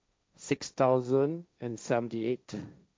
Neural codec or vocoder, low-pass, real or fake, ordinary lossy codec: codec, 16 kHz, 1.1 kbps, Voila-Tokenizer; none; fake; none